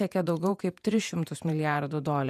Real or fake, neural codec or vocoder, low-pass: fake; vocoder, 48 kHz, 128 mel bands, Vocos; 14.4 kHz